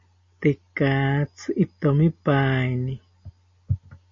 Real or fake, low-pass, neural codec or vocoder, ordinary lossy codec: real; 7.2 kHz; none; MP3, 32 kbps